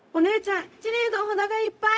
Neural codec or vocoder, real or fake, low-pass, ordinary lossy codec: codec, 16 kHz, 0.4 kbps, LongCat-Audio-Codec; fake; none; none